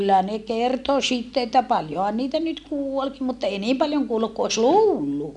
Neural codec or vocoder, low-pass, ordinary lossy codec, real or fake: none; 10.8 kHz; none; real